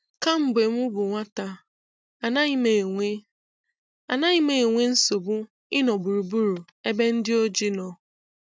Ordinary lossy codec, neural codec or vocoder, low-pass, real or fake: none; none; none; real